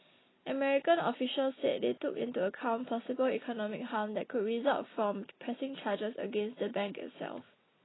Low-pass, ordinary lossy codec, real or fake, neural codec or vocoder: 7.2 kHz; AAC, 16 kbps; real; none